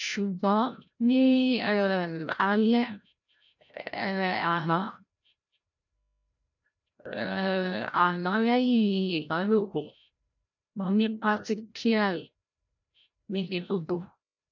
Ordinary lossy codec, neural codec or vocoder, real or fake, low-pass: none; codec, 16 kHz, 0.5 kbps, FreqCodec, larger model; fake; 7.2 kHz